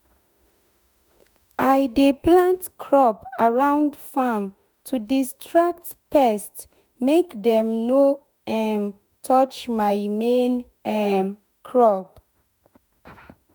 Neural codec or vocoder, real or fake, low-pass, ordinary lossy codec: autoencoder, 48 kHz, 32 numbers a frame, DAC-VAE, trained on Japanese speech; fake; none; none